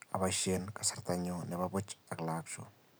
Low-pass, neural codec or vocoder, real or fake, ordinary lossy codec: none; none; real; none